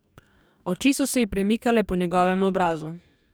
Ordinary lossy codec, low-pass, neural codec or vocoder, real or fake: none; none; codec, 44.1 kHz, 2.6 kbps, DAC; fake